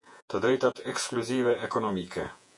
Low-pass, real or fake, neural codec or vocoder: 10.8 kHz; fake; vocoder, 48 kHz, 128 mel bands, Vocos